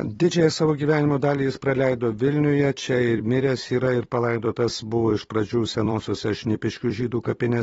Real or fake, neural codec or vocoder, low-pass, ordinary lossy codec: fake; vocoder, 44.1 kHz, 128 mel bands every 256 samples, BigVGAN v2; 19.8 kHz; AAC, 24 kbps